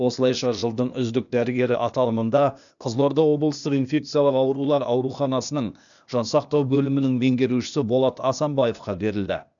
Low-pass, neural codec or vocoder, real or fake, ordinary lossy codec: 7.2 kHz; codec, 16 kHz, 0.8 kbps, ZipCodec; fake; none